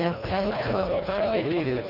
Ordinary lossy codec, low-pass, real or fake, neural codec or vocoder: none; 5.4 kHz; fake; codec, 24 kHz, 1.5 kbps, HILCodec